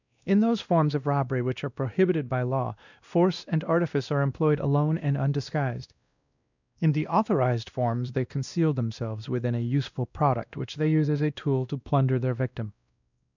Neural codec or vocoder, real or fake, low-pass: codec, 16 kHz, 1 kbps, X-Codec, WavLM features, trained on Multilingual LibriSpeech; fake; 7.2 kHz